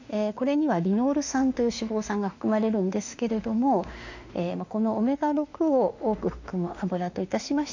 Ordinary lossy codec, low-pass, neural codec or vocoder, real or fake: none; 7.2 kHz; autoencoder, 48 kHz, 32 numbers a frame, DAC-VAE, trained on Japanese speech; fake